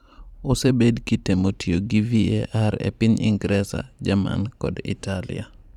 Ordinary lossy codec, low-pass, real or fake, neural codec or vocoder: none; 19.8 kHz; fake; vocoder, 44.1 kHz, 128 mel bands every 512 samples, BigVGAN v2